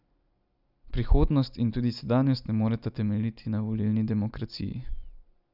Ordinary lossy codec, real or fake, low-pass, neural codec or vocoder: none; real; 5.4 kHz; none